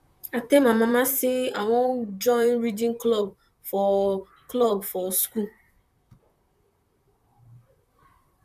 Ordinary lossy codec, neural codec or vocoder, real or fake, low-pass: none; vocoder, 44.1 kHz, 128 mel bands, Pupu-Vocoder; fake; 14.4 kHz